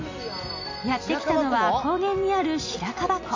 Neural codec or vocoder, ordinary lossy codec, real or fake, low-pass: none; AAC, 48 kbps; real; 7.2 kHz